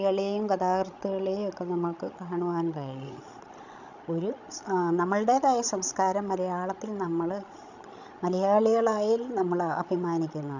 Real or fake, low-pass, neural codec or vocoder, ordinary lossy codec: fake; 7.2 kHz; codec, 16 kHz, 16 kbps, FreqCodec, larger model; none